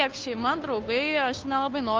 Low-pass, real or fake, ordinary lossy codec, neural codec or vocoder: 7.2 kHz; fake; Opus, 24 kbps; codec, 16 kHz, 2 kbps, FunCodec, trained on Chinese and English, 25 frames a second